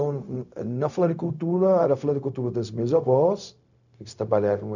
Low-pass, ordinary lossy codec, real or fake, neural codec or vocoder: 7.2 kHz; none; fake; codec, 16 kHz, 0.4 kbps, LongCat-Audio-Codec